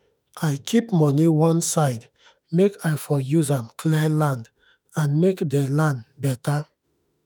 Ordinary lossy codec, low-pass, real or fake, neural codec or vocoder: none; none; fake; autoencoder, 48 kHz, 32 numbers a frame, DAC-VAE, trained on Japanese speech